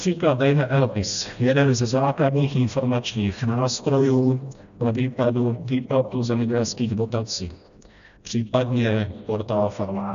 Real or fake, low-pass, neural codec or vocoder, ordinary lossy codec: fake; 7.2 kHz; codec, 16 kHz, 1 kbps, FreqCodec, smaller model; MP3, 96 kbps